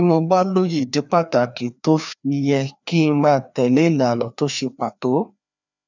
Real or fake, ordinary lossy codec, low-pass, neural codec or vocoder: fake; none; 7.2 kHz; codec, 16 kHz, 2 kbps, FreqCodec, larger model